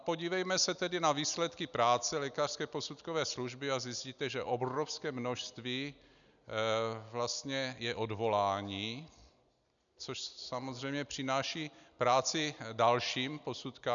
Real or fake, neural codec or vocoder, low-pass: real; none; 7.2 kHz